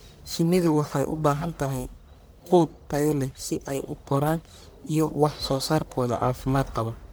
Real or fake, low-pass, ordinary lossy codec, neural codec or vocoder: fake; none; none; codec, 44.1 kHz, 1.7 kbps, Pupu-Codec